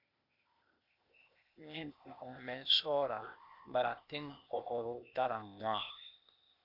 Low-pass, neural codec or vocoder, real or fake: 5.4 kHz; codec, 16 kHz, 0.8 kbps, ZipCodec; fake